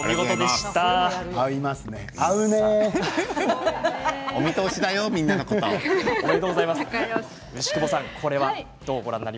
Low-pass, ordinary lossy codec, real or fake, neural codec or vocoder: none; none; real; none